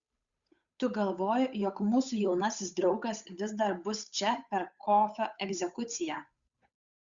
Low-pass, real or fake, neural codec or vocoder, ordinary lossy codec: 7.2 kHz; fake; codec, 16 kHz, 8 kbps, FunCodec, trained on Chinese and English, 25 frames a second; Opus, 64 kbps